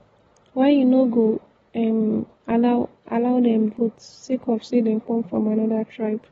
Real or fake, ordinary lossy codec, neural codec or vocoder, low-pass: real; AAC, 24 kbps; none; 19.8 kHz